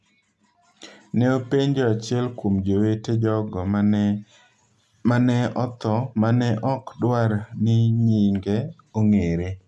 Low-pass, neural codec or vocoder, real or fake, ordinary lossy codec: none; none; real; none